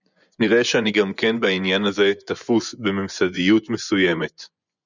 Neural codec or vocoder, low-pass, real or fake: vocoder, 24 kHz, 100 mel bands, Vocos; 7.2 kHz; fake